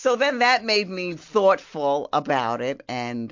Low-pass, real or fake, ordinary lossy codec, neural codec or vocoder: 7.2 kHz; real; MP3, 48 kbps; none